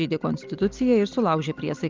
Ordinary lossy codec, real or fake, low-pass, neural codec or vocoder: Opus, 32 kbps; real; 7.2 kHz; none